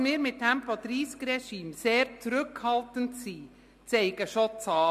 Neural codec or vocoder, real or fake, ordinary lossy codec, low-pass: none; real; MP3, 64 kbps; 14.4 kHz